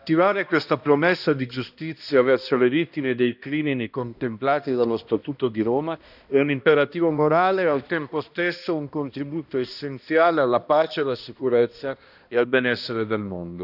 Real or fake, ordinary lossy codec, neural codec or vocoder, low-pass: fake; none; codec, 16 kHz, 1 kbps, X-Codec, HuBERT features, trained on balanced general audio; 5.4 kHz